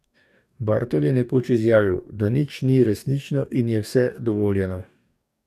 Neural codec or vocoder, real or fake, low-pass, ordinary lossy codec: codec, 44.1 kHz, 2.6 kbps, DAC; fake; 14.4 kHz; none